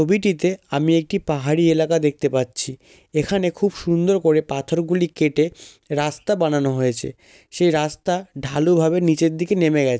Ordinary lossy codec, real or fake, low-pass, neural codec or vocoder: none; real; none; none